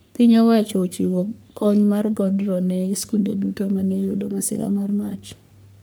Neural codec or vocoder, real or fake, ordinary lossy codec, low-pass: codec, 44.1 kHz, 3.4 kbps, Pupu-Codec; fake; none; none